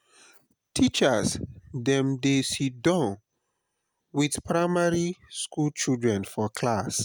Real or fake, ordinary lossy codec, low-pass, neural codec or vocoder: real; none; none; none